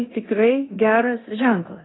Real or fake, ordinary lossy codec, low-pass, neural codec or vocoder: fake; AAC, 16 kbps; 7.2 kHz; codec, 16 kHz in and 24 kHz out, 0.9 kbps, LongCat-Audio-Codec, four codebook decoder